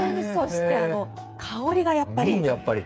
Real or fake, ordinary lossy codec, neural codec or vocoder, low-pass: fake; none; codec, 16 kHz, 8 kbps, FreqCodec, smaller model; none